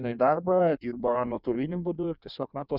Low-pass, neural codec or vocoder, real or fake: 5.4 kHz; codec, 16 kHz in and 24 kHz out, 1.1 kbps, FireRedTTS-2 codec; fake